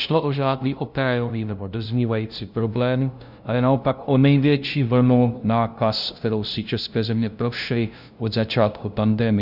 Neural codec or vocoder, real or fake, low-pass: codec, 16 kHz, 0.5 kbps, FunCodec, trained on LibriTTS, 25 frames a second; fake; 5.4 kHz